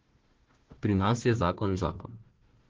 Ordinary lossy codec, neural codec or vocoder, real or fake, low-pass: Opus, 16 kbps; codec, 16 kHz, 1 kbps, FunCodec, trained on Chinese and English, 50 frames a second; fake; 7.2 kHz